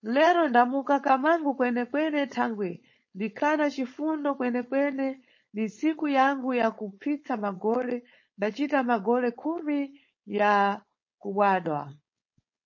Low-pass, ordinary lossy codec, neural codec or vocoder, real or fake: 7.2 kHz; MP3, 32 kbps; codec, 16 kHz, 4.8 kbps, FACodec; fake